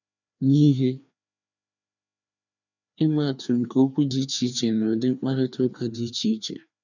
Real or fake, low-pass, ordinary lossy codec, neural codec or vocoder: fake; 7.2 kHz; none; codec, 16 kHz, 2 kbps, FreqCodec, larger model